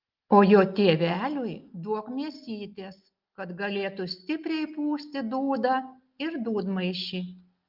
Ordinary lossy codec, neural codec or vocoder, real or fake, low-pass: Opus, 24 kbps; none; real; 5.4 kHz